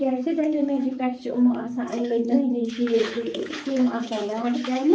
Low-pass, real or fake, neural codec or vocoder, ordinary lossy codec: none; fake; codec, 16 kHz, 4 kbps, X-Codec, HuBERT features, trained on balanced general audio; none